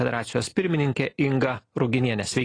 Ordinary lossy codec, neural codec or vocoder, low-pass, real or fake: AAC, 32 kbps; none; 9.9 kHz; real